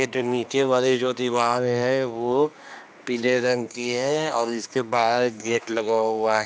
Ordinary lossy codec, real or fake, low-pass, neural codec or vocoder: none; fake; none; codec, 16 kHz, 2 kbps, X-Codec, HuBERT features, trained on general audio